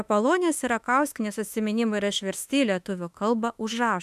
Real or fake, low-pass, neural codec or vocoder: fake; 14.4 kHz; autoencoder, 48 kHz, 32 numbers a frame, DAC-VAE, trained on Japanese speech